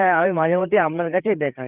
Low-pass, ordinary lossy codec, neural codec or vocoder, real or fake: 3.6 kHz; Opus, 24 kbps; codec, 16 kHz, 4 kbps, FreqCodec, larger model; fake